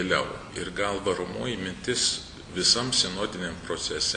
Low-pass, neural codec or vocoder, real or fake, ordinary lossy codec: 9.9 kHz; none; real; AAC, 64 kbps